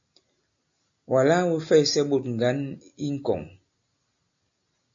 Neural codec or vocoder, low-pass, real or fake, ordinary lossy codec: none; 7.2 kHz; real; AAC, 64 kbps